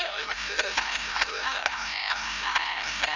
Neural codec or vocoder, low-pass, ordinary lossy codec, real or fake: codec, 16 kHz, 0.5 kbps, FreqCodec, larger model; 7.2 kHz; none; fake